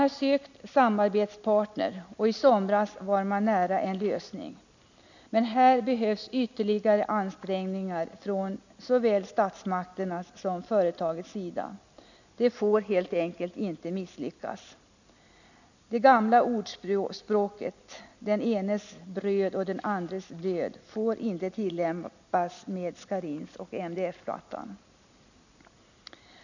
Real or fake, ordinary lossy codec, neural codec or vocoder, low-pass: real; none; none; 7.2 kHz